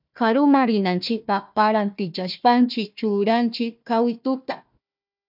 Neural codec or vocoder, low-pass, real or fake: codec, 16 kHz, 1 kbps, FunCodec, trained on Chinese and English, 50 frames a second; 5.4 kHz; fake